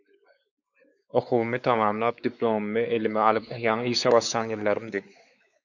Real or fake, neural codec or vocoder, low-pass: fake; codec, 16 kHz, 4 kbps, X-Codec, WavLM features, trained on Multilingual LibriSpeech; 7.2 kHz